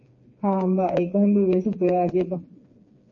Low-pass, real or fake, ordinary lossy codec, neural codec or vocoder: 7.2 kHz; fake; MP3, 32 kbps; codec, 16 kHz, 4 kbps, FreqCodec, smaller model